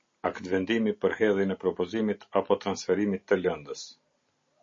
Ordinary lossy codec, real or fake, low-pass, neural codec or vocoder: MP3, 32 kbps; real; 7.2 kHz; none